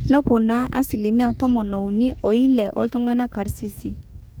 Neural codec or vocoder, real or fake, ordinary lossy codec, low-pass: codec, 44.1 kHz, 2.6 kbps, DAC; fake; none; none